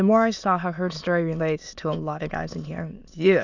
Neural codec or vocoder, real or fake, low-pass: autoencoder, 22.05 kHz, a latent of 192 numbers a frame, VITS, trained on many speakers; fake; 7.2 kHz